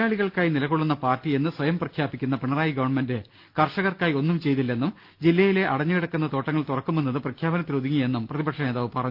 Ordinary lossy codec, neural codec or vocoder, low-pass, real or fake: Opus, 32 kbps; none; 5.4 kHz; real